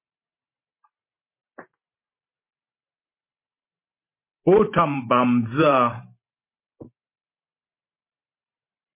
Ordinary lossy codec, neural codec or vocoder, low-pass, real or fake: MP3, 24 kbps; vocoder, 44.1 kHz, 128 mel bands every 512 samples, BigVGAN v2; 3.6 kHz; fake